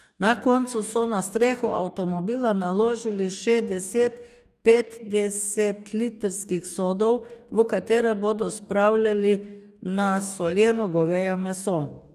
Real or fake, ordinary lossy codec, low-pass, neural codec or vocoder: fake; none; 14.4 kHz; codec, 44.1 kHz, 2.6 kbps, DAC